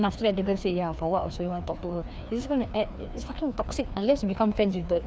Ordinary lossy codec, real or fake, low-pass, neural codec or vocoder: none; fake; none; codec, 16 kHz, 2 kbps, FreqCodec, larger model